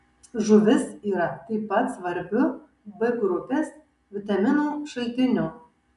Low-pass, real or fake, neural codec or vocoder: 10.8 kHz; real; none